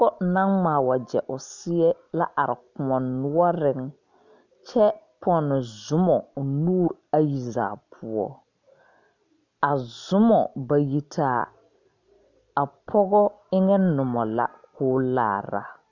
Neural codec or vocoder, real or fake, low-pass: none; real; 7.2 kHz